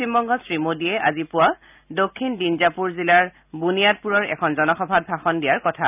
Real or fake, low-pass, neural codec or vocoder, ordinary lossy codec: real; 3.6 kHz; none; none